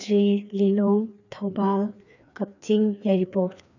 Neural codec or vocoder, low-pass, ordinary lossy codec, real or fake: codec, 16 kHz, 2 kbps, FreqCodec, larger model; 7.2 kHz; none; fake